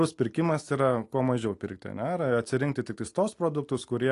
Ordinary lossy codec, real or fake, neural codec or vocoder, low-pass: AAC, 48 kbps; real; none; 10.8 kHz